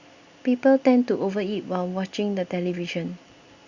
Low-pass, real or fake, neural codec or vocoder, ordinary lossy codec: 7.2 kHz; real; none; Opus, 64 kbps